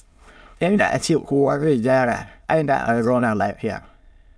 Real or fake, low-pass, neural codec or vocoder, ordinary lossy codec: fake; none; autoencoder, 22.05 kHz, a latent of 192 numbers a frame, VITS, trained on many speakers; none